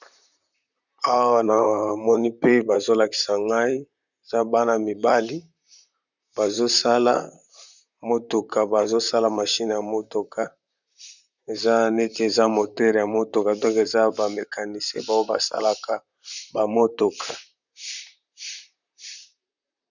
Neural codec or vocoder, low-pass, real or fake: vocoder, 44.1 kHz, 128 mel bands, Pupu-Vocoder; 7.2 kHz; fake